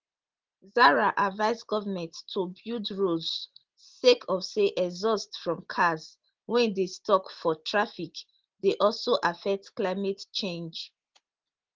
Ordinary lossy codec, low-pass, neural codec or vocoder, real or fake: Opus, 16 kbps; 7.2 kHz; none; real